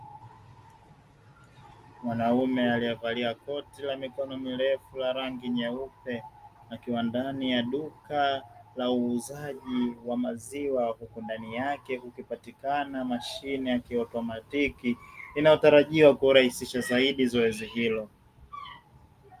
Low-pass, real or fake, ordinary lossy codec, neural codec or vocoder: 14.4 kHz; real; Opus, 32 kbps; none